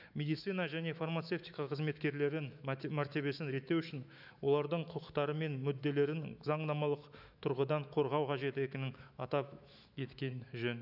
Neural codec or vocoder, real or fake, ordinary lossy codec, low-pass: autoencoder, 48 kHz, 128 numbers a frame, DAC-VAE, trained on Japanese speech; fake; none; 5.4 kHz